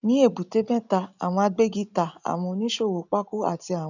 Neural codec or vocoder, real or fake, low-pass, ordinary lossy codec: none; real; 7.2 kHz; none